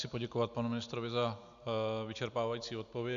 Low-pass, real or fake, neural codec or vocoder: 7.2 kHz; real; none